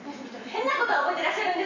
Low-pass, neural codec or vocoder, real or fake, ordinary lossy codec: 7.2 kHz; none; real; AAC, 48 kbps